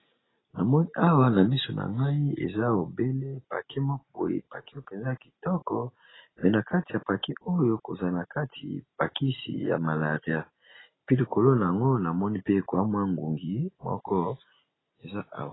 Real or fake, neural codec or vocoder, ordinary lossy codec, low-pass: real; none; AAC, 16 kbps; 7.2 kHz